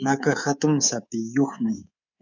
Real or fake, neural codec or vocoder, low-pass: fake; codec, 24 kHz, 3.1 kbps, DualCodec; 7.2 kHz